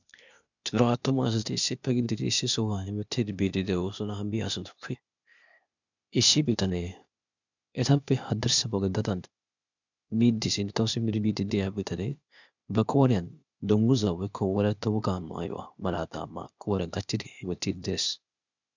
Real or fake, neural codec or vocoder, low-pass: fake; codec, 16 kHz, 0.8 kbps, ZipCodec; 7.2 kHz